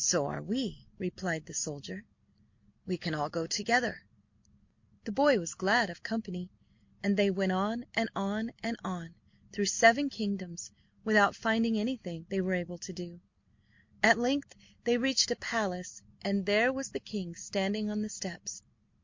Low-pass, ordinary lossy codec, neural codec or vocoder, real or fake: 7.2 kHz; MP3, 48 kbps; none; real